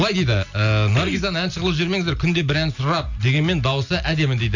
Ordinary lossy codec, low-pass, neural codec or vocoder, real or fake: none; 7.2 kHz; none; real